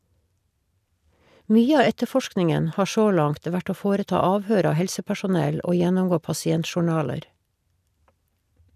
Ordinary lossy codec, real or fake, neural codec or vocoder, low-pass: none; real; none; 14.4 kHz